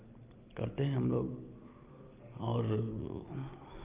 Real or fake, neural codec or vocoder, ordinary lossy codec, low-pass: real; none; Opus, 32 kbps; 3.6 kHz